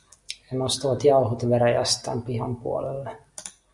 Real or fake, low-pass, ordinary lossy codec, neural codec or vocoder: real; 10.8 kHz; Opus, 64 kbps; none